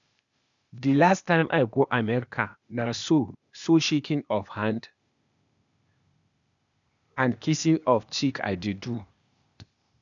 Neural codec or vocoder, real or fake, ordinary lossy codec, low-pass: codec, 16 kHz, 0.8 kbps, ZipCodec; fake; none; 7.2 kHz